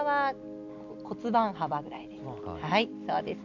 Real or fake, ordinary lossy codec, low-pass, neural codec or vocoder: real; none; 7.2 kHz; none